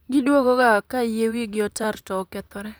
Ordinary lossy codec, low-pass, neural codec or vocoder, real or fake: none; none; vocoder, 44.1 kHz, 128 mel bands, Pupu-Vocoder; fake